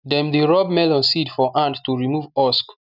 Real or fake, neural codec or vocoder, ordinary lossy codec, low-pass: real; none; none; 5.4 kHz